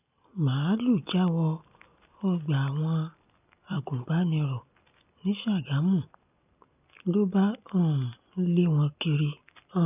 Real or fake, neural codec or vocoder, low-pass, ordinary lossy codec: real; none; 3.6 kHz; none